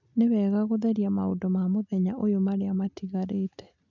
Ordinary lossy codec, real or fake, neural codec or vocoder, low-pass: none; real; none; 7.2 kHz